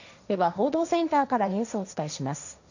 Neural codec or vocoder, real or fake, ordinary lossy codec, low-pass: codec, 16 kHz, 1.1 kbps, Voila-Tokenizer; fake; none; 7.2 kHz